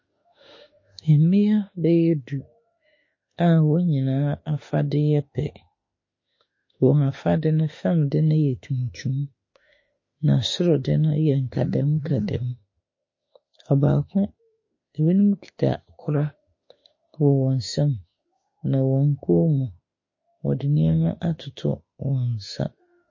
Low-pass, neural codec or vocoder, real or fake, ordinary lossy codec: 7.2 kHz; autoencoder, 48 kHz, 32 numbers a frame, DAC-VAE, trained on Japanese speech; fake; MP3, 32 kbps